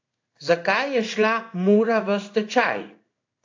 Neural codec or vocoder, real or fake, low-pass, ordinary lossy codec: codec, 16 kHz in and 24 kHz out, 1 kbps, XY-Tokenizer; fake; 7.2 kHz; AAC, 48 kbps